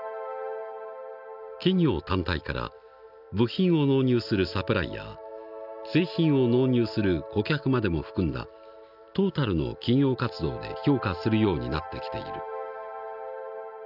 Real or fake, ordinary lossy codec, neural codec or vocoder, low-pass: real; none; none; 5.4 kHz